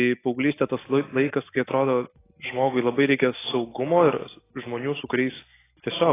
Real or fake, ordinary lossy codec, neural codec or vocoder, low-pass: real; AAC, 16 kbps; none; 3.6 kHz